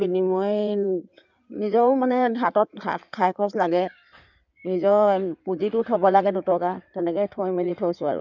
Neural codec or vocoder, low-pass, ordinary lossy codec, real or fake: codec, 16 kHz in and 24 kHz out, 2.2 kbps, FireRedTTS-2 codec; 7.2 kHz; none; fake